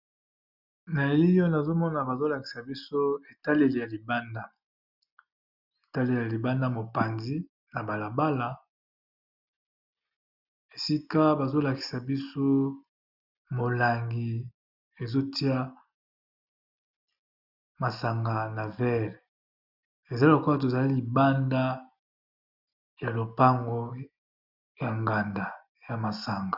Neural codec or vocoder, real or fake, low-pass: none; real; 5.4 kHz